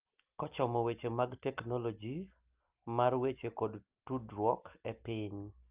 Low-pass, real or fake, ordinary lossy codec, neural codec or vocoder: 3.6 kHz; real; Opus, 24 kbps; none